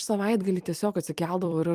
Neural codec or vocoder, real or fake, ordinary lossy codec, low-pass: vocoder, 44.1 kHz, 128 mel bands every 256 samples, BigVGAN v2; fake; Opus, 32 kbps; 14.4 kHz